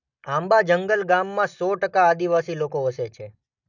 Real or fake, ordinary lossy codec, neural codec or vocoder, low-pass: real; none; none; 7.2 kHz